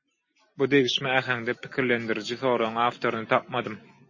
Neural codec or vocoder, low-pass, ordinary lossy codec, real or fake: none; 7.2 kHz; MP3, 32 kbps; real